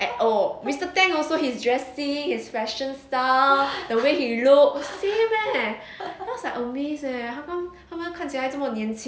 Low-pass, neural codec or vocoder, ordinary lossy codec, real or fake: none; none; none; real